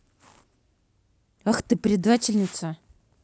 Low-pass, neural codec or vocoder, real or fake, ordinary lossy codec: none; none; real; none